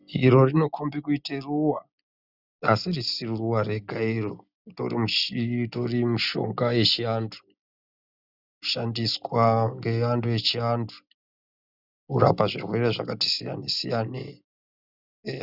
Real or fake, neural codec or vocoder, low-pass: real; none; 5.4 kHz